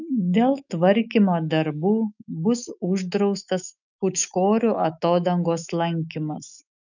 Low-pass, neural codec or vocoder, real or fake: 7.2 kHz; autoencoder, 48 kHz, 128 numbers a frame, DAC-VAE, trained on Japanese speech; fake